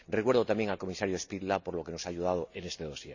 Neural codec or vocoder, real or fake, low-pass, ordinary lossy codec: none; real; 7.2 kHz; none